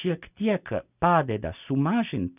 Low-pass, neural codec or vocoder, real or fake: 3.6 kHz; none; real